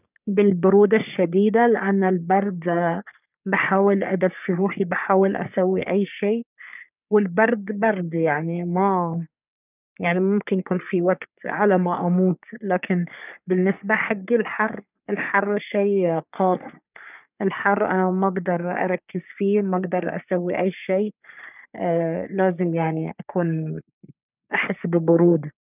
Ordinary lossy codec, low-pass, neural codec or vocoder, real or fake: none; 3.6 kHz; codec, 44.1 kHz, 3.4 kbps, Pupu-Codec; fake